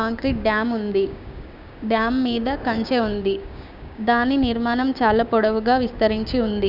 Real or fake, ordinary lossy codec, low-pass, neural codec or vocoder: fake; none; 5.4 kHz; codec, 16 kHz, 6 kbps, DAC